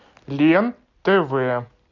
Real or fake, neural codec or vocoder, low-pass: real; none; 7.2 kHz